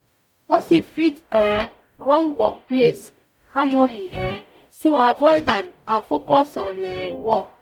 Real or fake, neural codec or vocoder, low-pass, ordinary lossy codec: fake; codec, 44.1 kHz, 0.9 kbps, DAC; 19.8 kHz; none